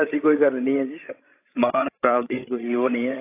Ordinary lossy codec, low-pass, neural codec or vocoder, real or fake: AAC, 24 kbps; 3.6 kHz; codec, 16 kHz, 16 kbps, FreqCodec, larger model; fake